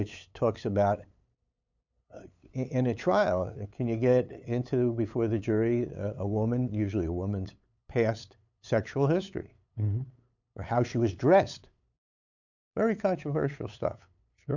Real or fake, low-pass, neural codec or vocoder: fake; 7.2 kHz; codec, 16 kHz, 8 kbps, FunCodec, trained on LibriTTS, 25 frames a second